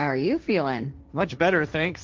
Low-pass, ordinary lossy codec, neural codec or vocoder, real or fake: 7.2 kHz; Opus, 32 kbps; codec, 16 kHz, 1.1 kbps, Voila-Tokenizer; fake